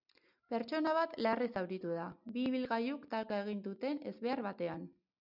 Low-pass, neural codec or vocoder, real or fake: 5.4 kHz; none; real